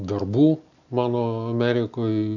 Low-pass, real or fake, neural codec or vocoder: 7.2 kHz; real; none